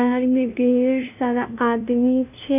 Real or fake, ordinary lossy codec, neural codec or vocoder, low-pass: fake; none; codec, 16 kHz, 0.5 kbps, FunCodec, trained on LibriTTS, 25 frames a second; 3.6 kHz